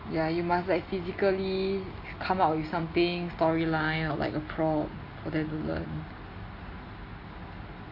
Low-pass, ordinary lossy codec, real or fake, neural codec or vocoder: 5.4 kHz; MP3, 32 kbps; real; none